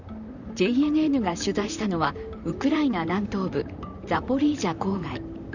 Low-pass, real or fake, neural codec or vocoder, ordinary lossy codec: 7.2 kHz; fake; vocoder, 44.1 kHz, 128 mel bands, Pupu-Vocoder; none